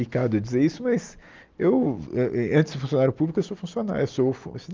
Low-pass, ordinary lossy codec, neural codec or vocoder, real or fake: 7.2 kHz; Opus, 24 kbps; none; real